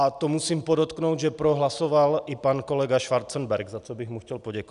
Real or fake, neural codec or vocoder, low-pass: real; none; 10.8 kHz